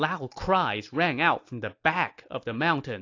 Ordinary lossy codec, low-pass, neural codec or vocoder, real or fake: AAC, 48 kbps; 7.2 kHz; none; real